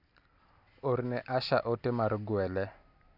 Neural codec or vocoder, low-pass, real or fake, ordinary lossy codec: none; 5.4 kHz; real; none